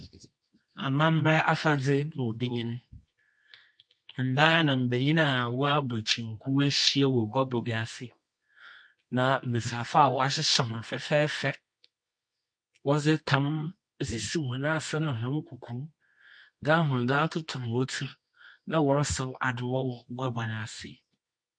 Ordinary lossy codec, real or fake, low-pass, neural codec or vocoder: MP3, 48 kbps; fake; 9.9 kHz; codec, 24 kHz, 0.9 kbps, WavTokenizer, medium music audio release